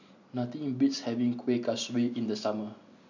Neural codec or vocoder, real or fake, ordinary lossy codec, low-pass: none; real; none; 7.2 kHz